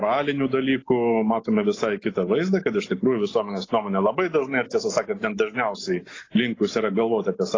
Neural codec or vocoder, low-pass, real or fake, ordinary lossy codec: none; 7.2 kHz; real; AAC, 32 kbps